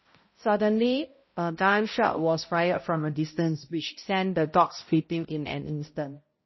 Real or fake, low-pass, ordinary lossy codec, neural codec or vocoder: fake; 7.2 kHz; MP3, 24 kbps; codec, 16 kHz, 0.5 kbps, X-Codec, HuBERT features, trained on balanced general audio